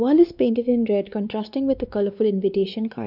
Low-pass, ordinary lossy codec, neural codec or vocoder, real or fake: 5.4 kHz; none; codec, 16 kHz, 2 kbps, X-Codec, WavLM features, trained on Multilingual LibriSpeech; fake